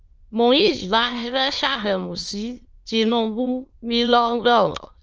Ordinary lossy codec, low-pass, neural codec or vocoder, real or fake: Opus, 24 kbps; 7.2 kHz; autoencoder, 22.05 kHz, a latent of 192 numbers a frame, VITS, trained on many speakers; fake